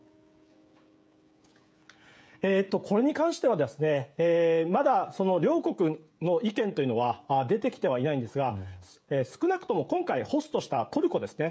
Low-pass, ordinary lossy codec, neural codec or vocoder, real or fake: none; none; codec, 16 kHz, 16 kbps, FreqCodec, smaller model; fake